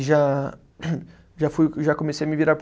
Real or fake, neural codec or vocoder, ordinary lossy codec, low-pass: real; none; none; none